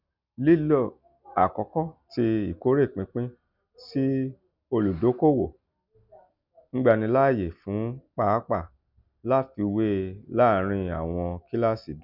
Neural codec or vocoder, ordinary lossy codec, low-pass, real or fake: none; none; 5.4 kHz; real